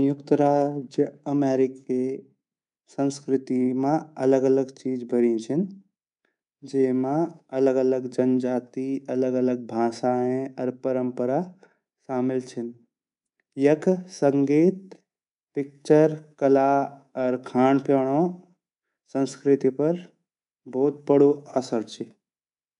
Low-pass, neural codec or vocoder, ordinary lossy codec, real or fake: 10.8 kHz; codec, 24 kHz, 3.1 kbps, DualCodec; none; fake